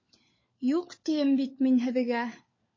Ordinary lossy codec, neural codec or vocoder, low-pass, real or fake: MP3, 32 kbps; codec, 16 kHz, 4 kbps, FunCodec, trained on LibriTTS, 50 frames a second; 7.2 kHz; fake